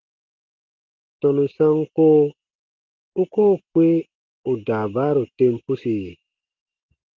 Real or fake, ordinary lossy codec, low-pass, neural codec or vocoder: real; Opus, 16 kbps; 7.2 kHz; none